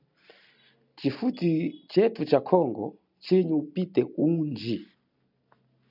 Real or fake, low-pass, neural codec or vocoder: real; 5.4 kHz; none